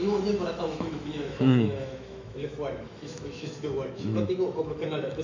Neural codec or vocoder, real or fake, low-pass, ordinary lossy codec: autoencoder, 48 kHz, 128 numbers a frame, DAC-VAE, trained on Japanese speech; fake; 7.2 kHz; AAC, 48 kbps